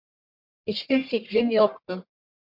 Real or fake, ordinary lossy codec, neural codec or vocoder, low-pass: fake; MP3, 48 kbps; codec, 44.1 kHz, 1.7 kbps, Pupu-Codec; 5.4 kHz